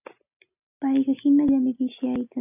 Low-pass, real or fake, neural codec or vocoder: 3.6 kHz; real; none